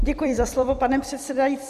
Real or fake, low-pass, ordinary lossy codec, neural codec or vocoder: fake; 14.4 kHz; MP3, 64 kbps; vocoder, 44.1 kHz, 128 mel bands every 256 samples, BigVGAN v2